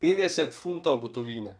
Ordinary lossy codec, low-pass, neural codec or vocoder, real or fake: none; 9.9 kHz; codec, 32 kHz, 1.9 kbps, SNAC; fake